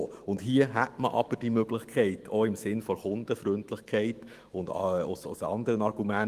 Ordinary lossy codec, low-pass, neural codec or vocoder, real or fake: Opus, 24 kbps; 14.4 kHz; autoencoder, 48 kHz, 128 numbers a frame, DAC-VAE, trained on Japanese speech; fake